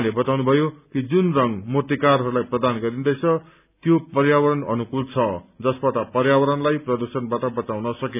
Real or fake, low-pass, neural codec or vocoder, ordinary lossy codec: real; 3.6 kHz; none; none